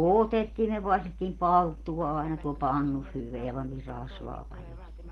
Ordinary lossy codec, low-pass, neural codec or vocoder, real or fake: Opus, 16 kbps; 19.8 kHz; none; real